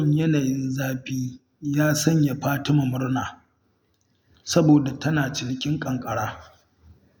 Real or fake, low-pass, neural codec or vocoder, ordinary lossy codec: real; none; none; none